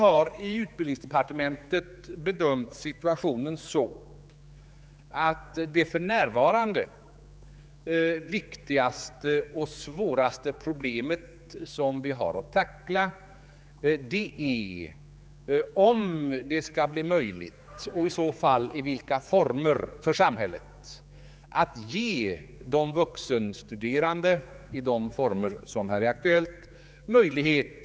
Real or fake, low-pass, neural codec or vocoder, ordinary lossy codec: fake; none; codec, 16 kHz, 4 kbps, X-Codec, HuBERT features, trained on general audio; none